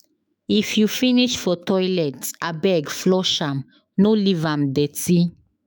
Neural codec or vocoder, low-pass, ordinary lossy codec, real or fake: autoencoder, 48 kHz, 128 numbers a frame, DAC-VAE, trained on Japanese speech; none; none; fake